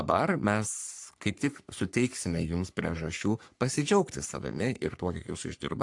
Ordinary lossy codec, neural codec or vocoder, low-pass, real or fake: AAC, 64 kbps; codec, 44.1 kHz, 3.4 kbps, Pupu-Codec; 10.8 kHz; fake